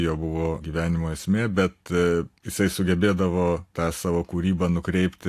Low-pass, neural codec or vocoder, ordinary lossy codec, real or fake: 14.4 kHz; none; AAC, 64 kbps; real